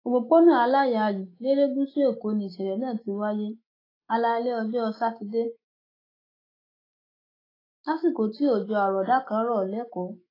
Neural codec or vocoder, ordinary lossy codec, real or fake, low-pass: autoencoder, 48 kHz, 128 numbers a frame, DAC-VAE, trained on Japanese speech; AAC, 24 kbps; fake; 5.4 kHz